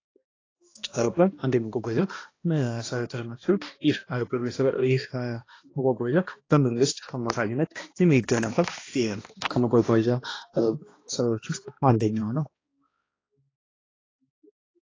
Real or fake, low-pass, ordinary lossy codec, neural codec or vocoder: fake; 7.2 kHz; AAC, 32 kbps; codec, 16 kHz, 1 kbps, X-Codec, HuBERT features, trained on balanced general audio